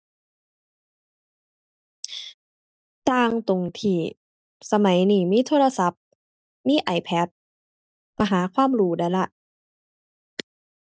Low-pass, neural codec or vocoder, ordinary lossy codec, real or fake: none; none; none; real